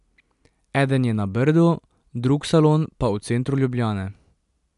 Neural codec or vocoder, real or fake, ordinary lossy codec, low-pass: none; real; none; 10.8 kHz